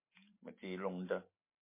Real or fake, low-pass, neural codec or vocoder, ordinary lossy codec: real; 3.6 kHz; none; MP3, 24 kbps